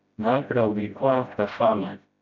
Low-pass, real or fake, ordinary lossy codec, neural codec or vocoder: 7.2 kHz; fake; MP3, 64 kbps; codec, 16 kHz, 0.5 kbps, FreqCodec, smaller model